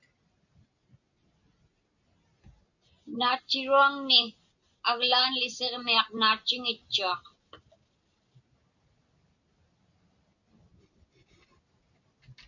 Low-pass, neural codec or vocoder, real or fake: 7.2 kHz; none; real